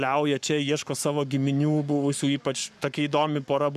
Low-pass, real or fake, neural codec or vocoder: 14.4 kHz; fake; codec, 44.1 kHz, 7.8 kbps, Pupu-Codec